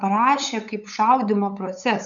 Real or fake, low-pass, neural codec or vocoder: fake; 7.2 kHz; codec, 16 kHz, 8 kbps, FunCodec, trained on LibriTTS, 25 frames a second